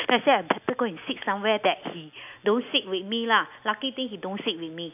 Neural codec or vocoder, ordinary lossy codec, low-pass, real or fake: none; none; 3.6 kHz; real